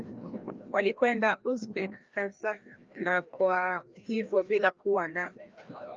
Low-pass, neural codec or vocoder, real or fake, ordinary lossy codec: 7.2 kHz; codec, 16 kHz, 1 kbps, FreqCodec, larger model; fake; Opus, 24 kbps